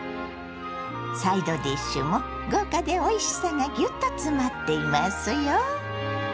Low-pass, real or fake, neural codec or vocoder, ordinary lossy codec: none; real; none; none